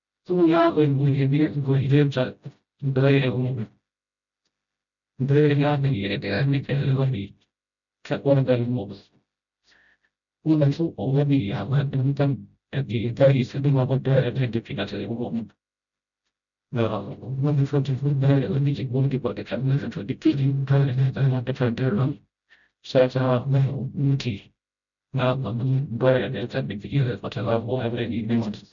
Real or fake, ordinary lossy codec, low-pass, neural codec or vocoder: fake; none; 7.2 kHz; codec, 16 kHz, 0.5 kbps, FreqCodec, smaller model